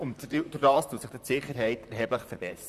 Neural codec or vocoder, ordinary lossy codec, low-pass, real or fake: vocoder, 44.1 kHz, 128 mel bands, Pupu-Vocoder; Opus, 64 kbps; 14.4 kHz; fake